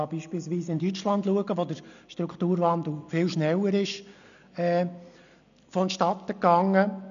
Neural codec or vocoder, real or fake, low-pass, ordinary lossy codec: none; real; 7.2 kHz; none